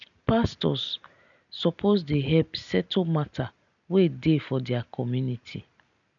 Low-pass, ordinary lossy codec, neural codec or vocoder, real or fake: 7.2 kHz; none; none; real